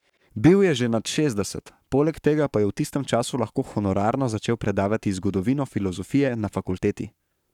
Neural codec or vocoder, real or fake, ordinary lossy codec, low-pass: codec, 44.1 kHz, 7.8 kbps, Pupu-Codec; fake; none; 19.8 kHz